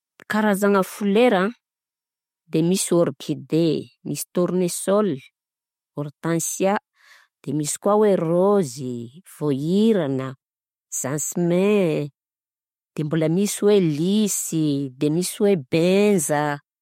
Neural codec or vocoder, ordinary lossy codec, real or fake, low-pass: none; MP3, 64 kbps; real; 19.8 kHz